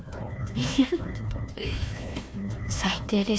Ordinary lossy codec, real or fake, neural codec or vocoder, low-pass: none; fake; codec, 16 kHz, 1 kbps, FunCodec, trained on Chinese and English, 50 frames a second; none